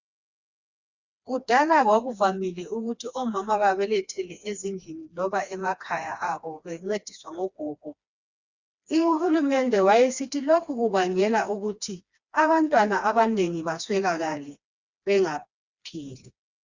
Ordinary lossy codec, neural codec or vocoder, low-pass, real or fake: Opus, 64 kbps; codec, 16 kHz, 2 kbps, FreqCodec, smaller model; 7.2 kHz; fake